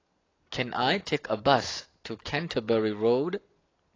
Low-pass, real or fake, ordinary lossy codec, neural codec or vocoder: 7.2 kHz; real; AAC, 32 kbps; none